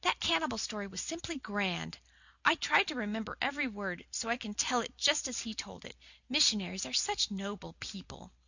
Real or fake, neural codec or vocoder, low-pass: real; none; 7.2 kHz